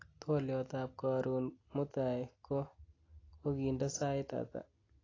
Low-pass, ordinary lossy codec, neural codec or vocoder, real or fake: 7.2 kHz; AAC, 32 kbps; none; real